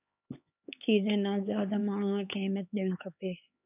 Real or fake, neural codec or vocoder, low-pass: fake; codec, 16 kHz, 4 kbps, X-Codec, HuBERT features, trained on LibriSpeech; 3.6 kHz